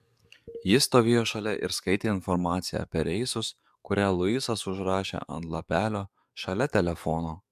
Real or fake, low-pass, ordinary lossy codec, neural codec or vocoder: fake; 14.4 kHz; MP3, 96 kbps; codec, 44.1 kHz, 7.8 kbps, DAC